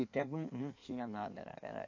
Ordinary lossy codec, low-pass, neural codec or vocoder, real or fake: AAC, 48 kbps; 7.2 kHz; codec, 16 kHz in and 24 kHz out, 1.1 kbps, FireRedTTS-2 codec; fake